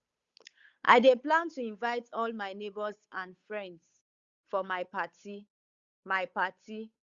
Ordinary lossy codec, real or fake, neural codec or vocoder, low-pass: Opus, 64 kbps; fake; codec, 16 kHz, 8 kbps, FunCodec, trained on Chinese and English, 25 frames a second; 7.2 kHz